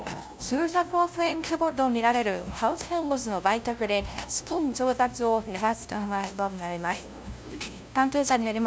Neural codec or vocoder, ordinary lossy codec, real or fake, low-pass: codec, 16 kHz, 0.5 kbps, FunCodec, trained on LibriTTS, 25 frames a second; none; fake; none